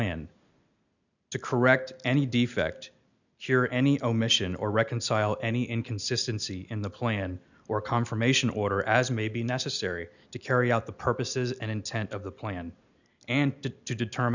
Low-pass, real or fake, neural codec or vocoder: 7.2 kHz; real; none